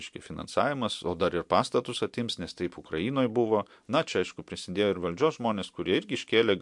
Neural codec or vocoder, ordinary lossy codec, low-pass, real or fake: none; MP3, 64 kbps; 10.8 kHz; real